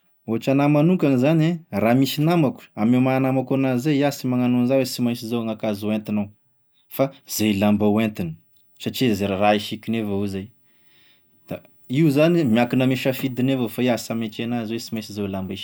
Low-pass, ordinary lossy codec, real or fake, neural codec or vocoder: none; none; real; none